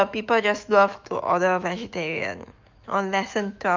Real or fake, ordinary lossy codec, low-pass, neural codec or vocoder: fake; Opus, 24 kbps; 7.2 kHz; autoencoder, 48 kHz, 128 numbers a frame, DAC-VAE, trained on Japanese speech